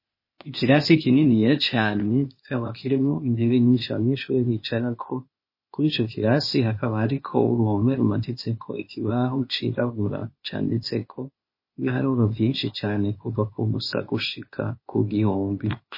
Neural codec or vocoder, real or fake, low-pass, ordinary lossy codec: codec, 16 kHz, 0.8 kbps, ZipCodec; fake; 5.4 kHz; MP3, 24 kbps